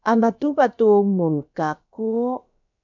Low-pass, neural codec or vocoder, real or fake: 7.2 kHz; codec, 16 kHz, about 1 kbps, DyCAST, with the encoder's durations; fake